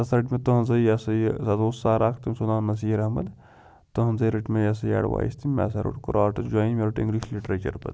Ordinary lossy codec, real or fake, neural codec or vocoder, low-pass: none; real; none; none